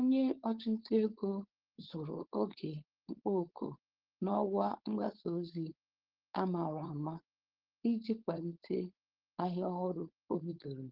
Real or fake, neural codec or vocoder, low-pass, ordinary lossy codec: fake; codec, 16 kHz, 4.8 kbps, FACodec; 5.4 kHz; Opus, 16 kbps